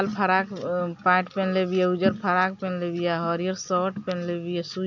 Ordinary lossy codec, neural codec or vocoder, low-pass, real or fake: none; none; 7.2 kHz; real